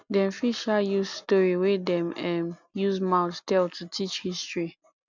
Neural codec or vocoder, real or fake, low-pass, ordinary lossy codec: none; real; 7.2 kHz; none